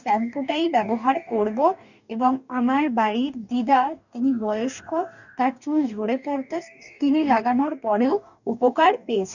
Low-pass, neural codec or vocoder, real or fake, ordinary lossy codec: 7.2 kHz; codec, 44.1 kHz, 2.6 kbps, DAC; fake; none